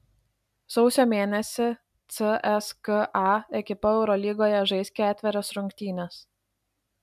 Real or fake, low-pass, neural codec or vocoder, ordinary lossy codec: real; 14.4 kHz; none; MP3, 96 kbps